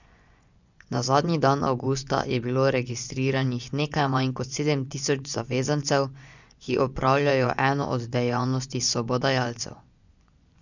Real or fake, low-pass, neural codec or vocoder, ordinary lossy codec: fake; 7.2 kHz; vocoder, 24 kHz, 100 mel bands, Vocos; none